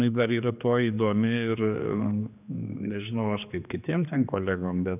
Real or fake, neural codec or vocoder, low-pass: fake; codec, 16 kHz, 2 kbps, X-Codec, HuBERT features, trained on general audio; 3.6 kHz